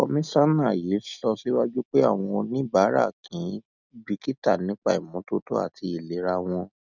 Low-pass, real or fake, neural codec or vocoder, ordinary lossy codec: 7.2 kHz; real; none; none